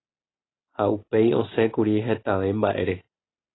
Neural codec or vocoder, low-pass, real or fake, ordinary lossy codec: none; 7.2 kHz; real; AAC, 16 kbps